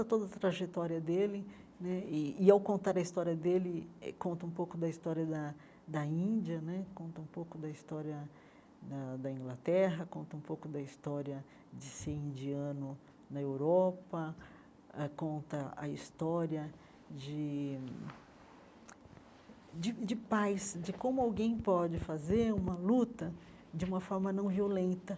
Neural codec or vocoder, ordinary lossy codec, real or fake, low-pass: none; none; real; none